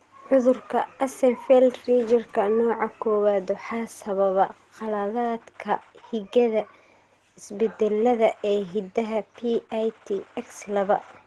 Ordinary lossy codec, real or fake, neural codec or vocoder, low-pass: Opus, 16 kbps; real; none; 10.8 kHz